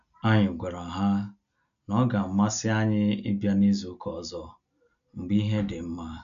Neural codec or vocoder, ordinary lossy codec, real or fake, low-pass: none; none; real; 7.2 kHz